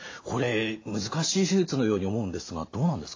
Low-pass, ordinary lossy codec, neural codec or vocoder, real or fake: 7.2 kHz; AAC, 32 kbps; none; real